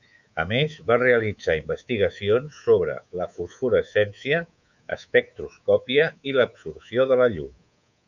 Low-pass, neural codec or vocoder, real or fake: 7.2 kHz; codec, 24 kHz, 3.1 kbps, DualCodec; fake